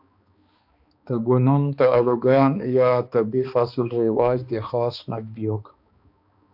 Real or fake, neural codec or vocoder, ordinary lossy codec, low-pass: fake; codec, 16 kHz, 2 kbps, X-Codec, HuBERT features, trained on balanced general audio; AAC, 48 kbps; 5.4 kHz